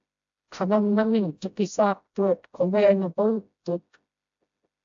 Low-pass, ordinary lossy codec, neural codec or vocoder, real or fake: 7.2 kHz; AAC, 64 kbps; codec, 16 kHz, 0.5 kbps, FreqCodec, smaller model; fake